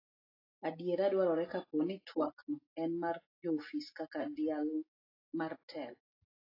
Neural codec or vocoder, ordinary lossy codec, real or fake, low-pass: none; AAC, 24 kbps; real; 5.4 kHz